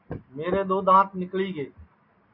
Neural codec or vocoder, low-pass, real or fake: none; 5.4 kHz; real